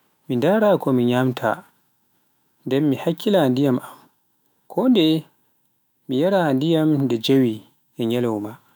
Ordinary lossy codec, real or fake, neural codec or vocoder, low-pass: none; fake; autoencoder, 48 kHz, 128 numbers a frame, DAC-VAE, trained on Japanese speech; none